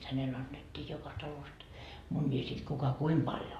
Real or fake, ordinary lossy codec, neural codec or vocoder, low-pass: real; AAC, 48 kbps; none; 14.4 kHz